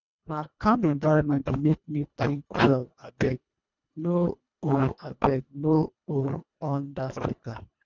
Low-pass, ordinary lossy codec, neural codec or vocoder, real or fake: 7.2 kHz; none; codec, 24 kHz, 1.5 kbps, HILCodec; fake